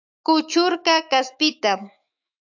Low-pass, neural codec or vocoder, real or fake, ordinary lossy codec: 7.2 kHz; autoencoder, 48 kHz, 128 numbers a frame, DAC-VAE, trained on Japanese speech; fake; AAC, 48 kbps